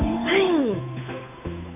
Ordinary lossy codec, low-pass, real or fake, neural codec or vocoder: none; 3.6 kHz; fake; codec, 16 kHz, 8 kbps, FunCodec, trained on Chinese and English, 25 frames a second